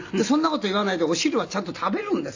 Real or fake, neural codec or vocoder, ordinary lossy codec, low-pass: real; none; AAC, 48 kbps; 7.2 kHz